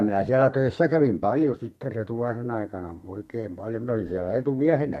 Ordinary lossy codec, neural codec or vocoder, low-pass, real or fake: MP3, 64 kbps; codec, 32 kHz, 1.9 kbps, SNAC; 14.4 kHz; fake